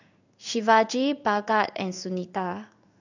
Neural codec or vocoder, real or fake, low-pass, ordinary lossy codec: codec, 16 kHz in and 24 kHz out, 1 kbps, XY-Tokenizer; fake; 7.2 kHz; none